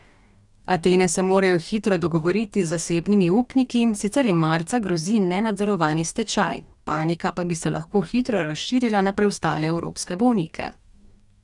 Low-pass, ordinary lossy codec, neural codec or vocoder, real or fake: 10.8 kHz; none; codec, 44.1 kHz, 2.6 kbps, DAC; fake